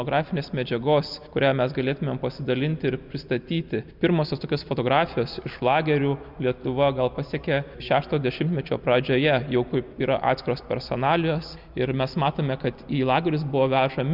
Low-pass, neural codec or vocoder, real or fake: 5.4 kHz; none; real